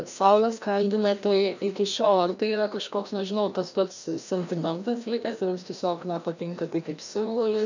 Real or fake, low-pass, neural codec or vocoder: fake; 7.2 kHz; codec, 16 kHz, 1 kbps, FreqCodec, larger model